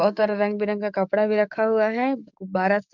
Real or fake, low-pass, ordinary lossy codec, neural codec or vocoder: fake; 7.2 kHz; none; codec, 44.1 kHz, 7.8 kbps, DAC